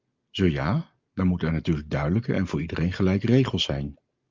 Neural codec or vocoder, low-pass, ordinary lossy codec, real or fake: none; 7.2 kHz; Opus, 24 kbps; real